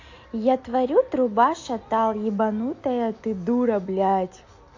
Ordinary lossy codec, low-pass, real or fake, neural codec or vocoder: AAC, 48 kbps; 7.2 kHz; real; none